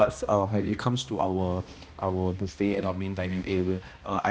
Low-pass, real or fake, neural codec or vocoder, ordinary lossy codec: none; fake; codec, 16 kHz, 1 kbps, X-Codec, HuBERT features, trained on balanced general audio; none